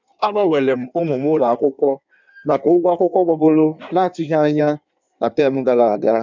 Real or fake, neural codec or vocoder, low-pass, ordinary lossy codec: fake; codec, 16 kHz in and 24 kHz out, 1.1 kbps, FireRedTTS-2 codec; 7.2 kHz; none